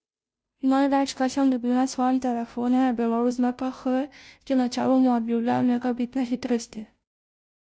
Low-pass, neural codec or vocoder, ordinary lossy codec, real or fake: none; codec, 16 kHz, 0.5 kbps, FunCodec, trained on Chinese and English, 25 frames a second; none; fake